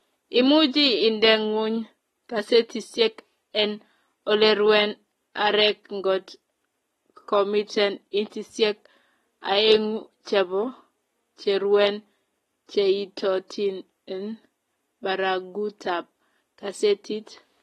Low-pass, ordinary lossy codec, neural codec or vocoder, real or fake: 19.8 kHz; AAC, 32 kbps; none; real